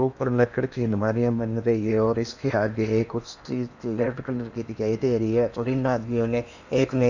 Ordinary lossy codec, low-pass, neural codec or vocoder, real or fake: none; 7.2 kHz; codec, 16 kHz in and 24 kHz out, 0.8 kbps, FocalCodec, streaming, 65536 codes; fake